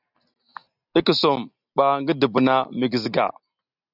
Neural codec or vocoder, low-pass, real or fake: none; 5.4 kHz; real